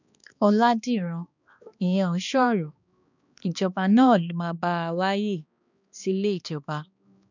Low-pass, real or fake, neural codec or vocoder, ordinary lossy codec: 7.2 kHz; fake; codec, 16 kHz, 2 kbps, X-Codec, HuBERT features, trained on balanced general audio; none